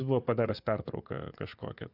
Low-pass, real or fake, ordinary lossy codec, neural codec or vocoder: 5.4 kHz; fake; AAC, 48 kbps; vocoder, 24 kHz, 100 mel bands, Vocos